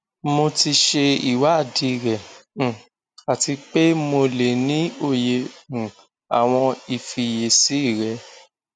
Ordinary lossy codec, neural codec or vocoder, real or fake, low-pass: none; none; real; 9.9 kHz